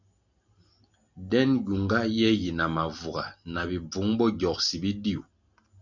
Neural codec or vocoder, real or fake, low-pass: none; real; 7.2 kHz